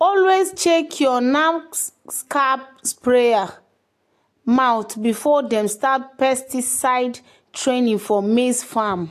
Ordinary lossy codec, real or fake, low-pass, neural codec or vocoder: AAC, 64 kbps; real; 14.4 kHz; none